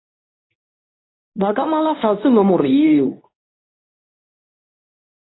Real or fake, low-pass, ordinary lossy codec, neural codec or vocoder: fake; 7.2 kHz; AAC, 16 kbps; codec, 24 kHz, 0.9 kbps, WavTokenizer, medium speech release version 2